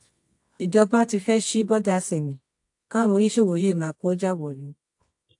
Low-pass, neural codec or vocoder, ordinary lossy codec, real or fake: 10.8 kHz; codec, 24 kHz, 0.9 kbps, WavTokenizer, medium music audio release; AAC, 64 kbps; fake